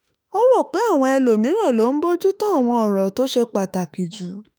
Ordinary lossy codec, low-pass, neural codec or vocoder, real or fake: none; none; autoencoder, 48 kHz, 32 numbers a frame, DAC-VAE, trained on Japanese speech; fake